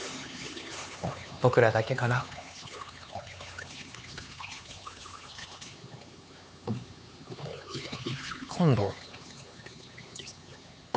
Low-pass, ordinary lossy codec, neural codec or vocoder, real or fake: none; none; codec, 16 kHz, 4 kbps, X-Codec, HuBERT features, trained on LibriSpeech; fake